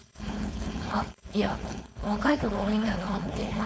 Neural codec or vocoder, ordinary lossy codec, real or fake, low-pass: codec, 16 kHz, 4.8 kbps, FACodec; none; fake; none